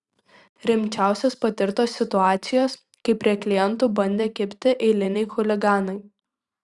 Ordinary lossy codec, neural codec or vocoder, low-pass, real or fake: Opus, 64 kbps; vocoder, 44.1 kHz, 128 mel bands every 512 samples, BigVGAN v2; 10.8 kHz; fake